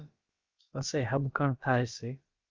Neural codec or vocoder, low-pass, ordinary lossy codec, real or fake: codec, 16 kHz, about 1 kbps, DyCAST, with the encoder's durations; 7.2 kHz; Opus, 32 kbps; fake